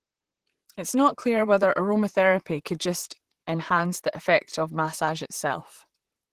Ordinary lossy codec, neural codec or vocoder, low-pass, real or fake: Opus, 16 kbps; vocoder, 44.1 kHz, 128 mel bands, Pupu-Vocoder; 14.4 kHz; fake